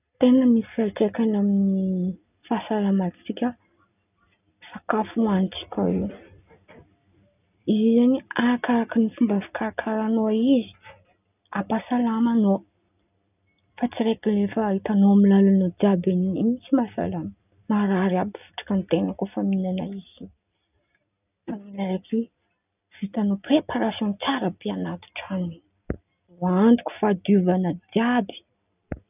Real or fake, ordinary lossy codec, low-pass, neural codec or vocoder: real; none; 3.6 kHz; none